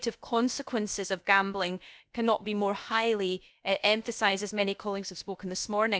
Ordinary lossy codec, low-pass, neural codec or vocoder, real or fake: none; none; codec, 16 kHz, about 1 kbps, DyCAST, with the encoder's durations; fake